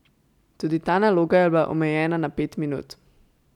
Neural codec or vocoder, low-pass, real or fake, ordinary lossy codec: none; 19.8 kHz; real; none